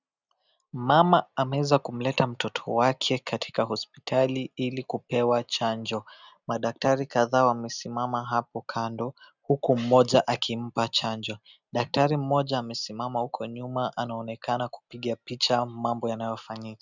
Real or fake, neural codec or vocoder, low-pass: real; none; 7.2 kHz